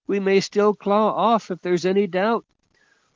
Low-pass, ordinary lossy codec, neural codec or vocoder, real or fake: 7.2 kHz; Opus, 32 kbps; none; real